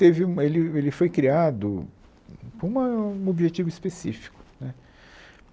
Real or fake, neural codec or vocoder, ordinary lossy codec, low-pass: real; none; none; none